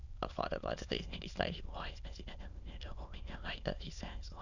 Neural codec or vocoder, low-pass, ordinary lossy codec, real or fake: autoencoder, 22.05 kHz, a latent of 192 numbers a frame, VITS, trained on many speakers; 7.2 kHz; none; fake